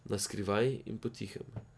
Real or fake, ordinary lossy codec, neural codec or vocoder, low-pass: real; none; none; none